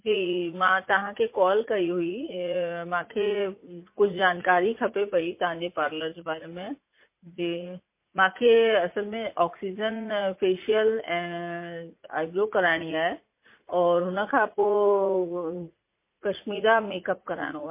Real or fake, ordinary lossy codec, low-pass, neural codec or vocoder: fake; MP3, 24 kbps; 3.6 kHz; vocoder, 44.1 kHz, 80 mel bands, Vocos